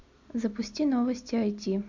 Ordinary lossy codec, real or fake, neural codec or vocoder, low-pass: AAC, 48 kbps; real; none; 7.2 kHz